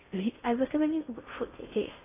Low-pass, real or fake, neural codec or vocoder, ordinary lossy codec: 3.6 kHz; fake; codec, 16 kHz in and 24 kHz out, 0.6 kbps, FocalCodec, streaming, 4096 codes; AAC, 16 kbps